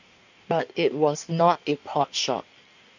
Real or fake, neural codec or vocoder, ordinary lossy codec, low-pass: fake; codec, 16 kHz in and 24 kHz out, 1.1 kbps, FireRedTTS-2 codec; none; 7.2 kHz